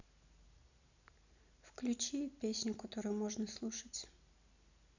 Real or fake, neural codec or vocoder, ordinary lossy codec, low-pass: real; none; none; 7.2 kHz